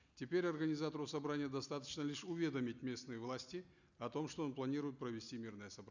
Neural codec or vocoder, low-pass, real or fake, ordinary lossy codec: none; 7.2 kHz; real; none